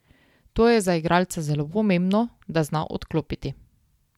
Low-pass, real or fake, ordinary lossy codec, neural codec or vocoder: 19.8 kHz; real; MP3, 96 kbps; none